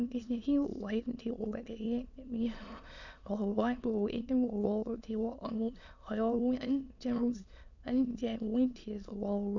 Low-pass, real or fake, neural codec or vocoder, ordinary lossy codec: 7.2 kHz; fake; autoencoder, 22.05 kHz, a latent of 192 numbers a frame, VITS, trained on many speakers; none